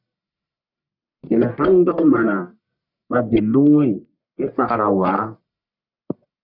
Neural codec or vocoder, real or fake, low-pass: codec, 44.1 kHz, 1.7 kbps, Pupu-Codec; fake; 5.4 kHz